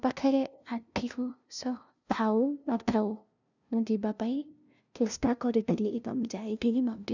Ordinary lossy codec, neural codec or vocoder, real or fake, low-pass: none; codec, 16 kHz, 0.5 kbps, FunCodec, trained on LibriTTS, 25 frames a second; fake; 7.2 kHz